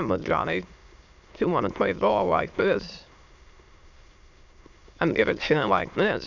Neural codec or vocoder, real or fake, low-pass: autoencoder, 22.05 kHz, a latent of 192 numbers a frame, VITS, trained on many speakers; fake; 7.2 kHz